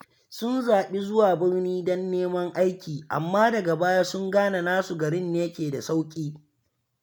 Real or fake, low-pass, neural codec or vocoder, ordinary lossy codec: real; none; none; none